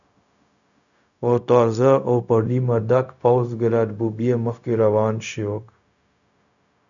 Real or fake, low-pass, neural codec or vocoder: fake; 7.2 kHz; codec, 16 kHz, 0.4 kbps, LongCat-Audio-Codec